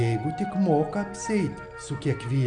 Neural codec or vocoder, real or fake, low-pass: none; real; 9.9 kHz